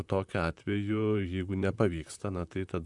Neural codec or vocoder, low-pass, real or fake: vocoder, 44.1 kHz, 128 mel bands, Pupu-Vocoder; 10.8 kHz; fake